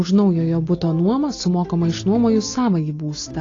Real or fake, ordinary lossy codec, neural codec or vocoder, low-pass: real; AAC, 32 kbps; none; 7.2 kHz